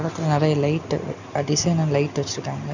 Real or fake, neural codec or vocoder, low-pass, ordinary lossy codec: real; none; 7.2 kHz; none